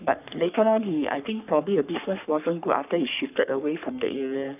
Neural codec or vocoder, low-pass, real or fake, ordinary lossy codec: codec, 44.1 kHz, 3.4 kbps, Pupu-Codec; 3.6 kHz; fake; none